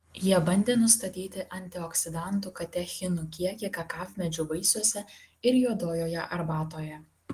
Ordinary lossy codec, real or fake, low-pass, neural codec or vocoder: Opus, 24 kbps; real; 14.4 kHz; none